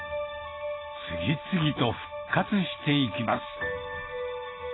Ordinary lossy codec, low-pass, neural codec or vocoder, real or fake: AAC, 16 kbps; 7.2 kHz; none; real